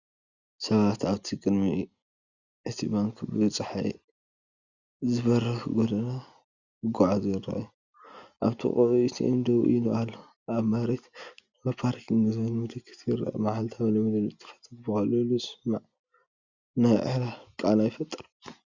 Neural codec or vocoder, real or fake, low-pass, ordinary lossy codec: none; real; 7.2 kHz; Opus, 64 kbps